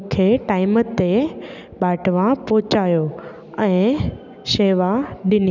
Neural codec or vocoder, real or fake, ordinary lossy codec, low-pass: none; real; none; 7.2 kHz